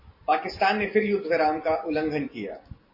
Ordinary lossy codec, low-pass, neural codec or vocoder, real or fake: MP3, 24 kbps; 5.4 kHz; none; real